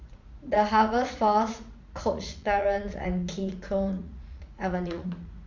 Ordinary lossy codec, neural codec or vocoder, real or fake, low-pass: none; vocoder, 44.1 kHz, 80 mel bands, Vocos; fake; 7.2 kHz